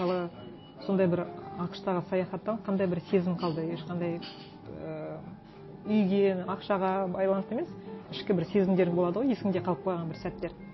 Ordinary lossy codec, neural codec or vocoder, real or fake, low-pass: MP3, 24 kbps; none; real; 7.2 kHz